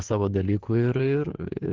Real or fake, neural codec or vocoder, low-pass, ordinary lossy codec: fake; codec, 16 kHz in and 24 kHz out, 2.2 kbps, FireRedTTS-2 codec; 7.2 kHz; Opus, 16 kbps